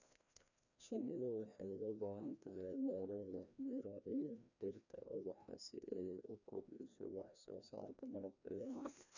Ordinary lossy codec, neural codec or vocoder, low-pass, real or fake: none; codec, 16 kHz, 1 kbps, FreqCodec, larger model; 7.2 kHz; fake